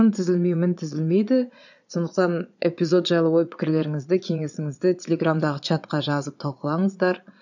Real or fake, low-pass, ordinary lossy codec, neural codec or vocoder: fake; 7.2 kHz; none; vocoder, 22.05 kHz, 80 mel bands, Vocos